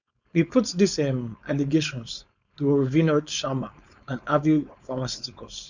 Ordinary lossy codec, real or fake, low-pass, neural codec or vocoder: none; fake; 7.2 kHz; codec, 16 kHz, 4.8 kbps, FACodec